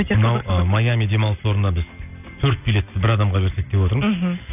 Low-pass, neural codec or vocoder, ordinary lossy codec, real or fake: 3.6 kHz; none; none; real